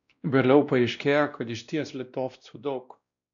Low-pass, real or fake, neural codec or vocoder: 7.2 kHz; fake; codec, 16 kHz, 1 kbps, X-Codec, WavLM features, trained on Multilingual LibriSpeech